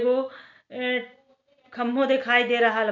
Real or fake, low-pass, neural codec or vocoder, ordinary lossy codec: real; 7.2 kHz; none; none